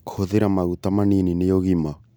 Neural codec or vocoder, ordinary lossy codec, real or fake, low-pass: none; none; real; none